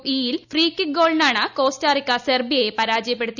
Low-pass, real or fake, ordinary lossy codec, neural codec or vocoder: 7.2 kHz; real; none; none